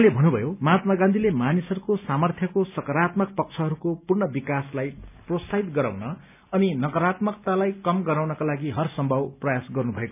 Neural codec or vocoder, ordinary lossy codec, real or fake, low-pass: none; none; real; 3.6 kHz